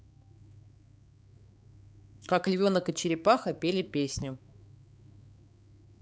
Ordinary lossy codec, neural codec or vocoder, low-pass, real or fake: none; codec, 16 kHz, 4 kbps, X-Codec, HuBERT features, trained on balanced general audio; none; fake